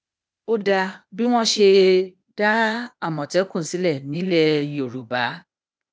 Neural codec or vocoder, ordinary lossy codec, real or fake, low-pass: codec, 16 kHz, 0.8 kbps, ZipCodec; none; fake; none